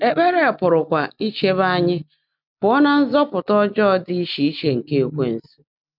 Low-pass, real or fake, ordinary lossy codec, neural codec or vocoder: 5.4 kHz; real; none; none